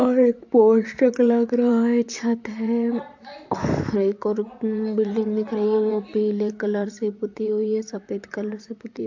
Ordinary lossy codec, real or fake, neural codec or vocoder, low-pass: AAC, 48 kbps; fake; vocoder, 44.1 kHz, 128 mel bands, Pupu-Vocoder; 7.2 kHz